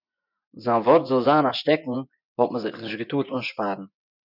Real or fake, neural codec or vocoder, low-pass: fake; vocoder, 24 kHz, 100 mel bands, Vocos; 5.4 kHz